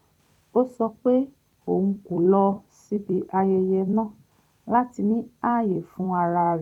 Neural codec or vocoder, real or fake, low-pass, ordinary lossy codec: vocoder, 44.1 kHz, 128 mel bands every 256 samples, BigVGAN v2; fake; 19.8 kHz; none